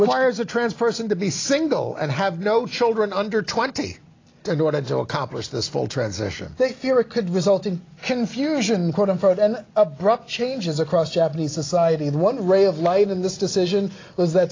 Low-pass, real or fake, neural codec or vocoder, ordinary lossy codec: 7.2 kHz; real; none; AAC, 32 kbps